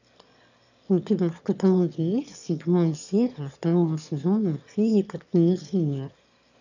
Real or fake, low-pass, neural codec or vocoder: fake; 7.2 kHz; autoencoder, 22.05 kHz, a latent of 192 numbers a frame, VITS, trained on one speaker